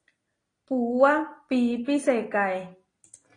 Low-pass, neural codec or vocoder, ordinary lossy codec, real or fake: 9.9 kHz; none; AAC, 32 kbps; real